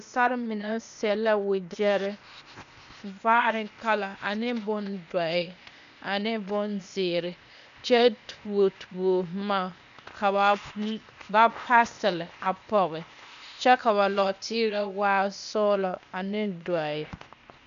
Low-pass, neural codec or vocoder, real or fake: 7.2 kHz; codec, 16 kHz, 0.8 kbps, ZipCodec; fake